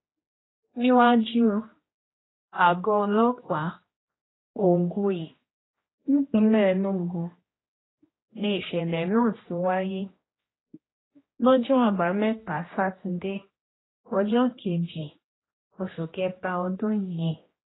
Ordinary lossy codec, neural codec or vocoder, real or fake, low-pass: AAC, 16 kbps; codec, 16 kHz, 1 kbps, X-Codec, HuBERT features, trained on general audio; fake; 7.2 kHz